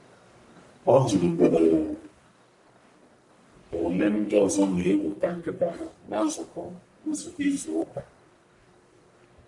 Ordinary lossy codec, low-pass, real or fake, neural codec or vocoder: none; 10.8 kHz; fake; codec, 44.1 kHz, 1.7 kbps, Pupu-Codec